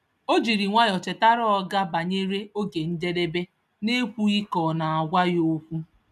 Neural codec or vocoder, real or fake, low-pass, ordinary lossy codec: none; real; 14.4 kHz; none